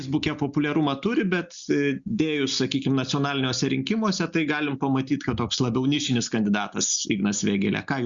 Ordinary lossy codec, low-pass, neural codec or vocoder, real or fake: Opus, 64 kbps; 7.2 kHz; none; real